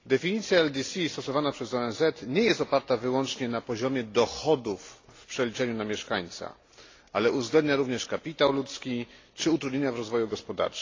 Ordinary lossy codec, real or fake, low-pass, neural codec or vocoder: AAC, 32 kbps; real; 7.2 kHz; none